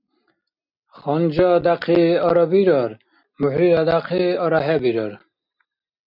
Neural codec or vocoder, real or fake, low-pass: none; real; 5.4 kHz